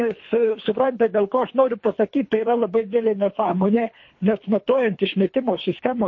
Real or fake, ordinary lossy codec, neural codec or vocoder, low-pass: fake; MP3, 32 kbps; codec, 24 kHz, 3 kbps, HILCodec; 7.2 kHz